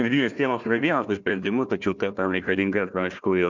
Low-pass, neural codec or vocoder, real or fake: 7.2 kHz; codec, 16 kHz, 1 kbps, FunCodec, trained on Chinese and English, 50 frames a second; fake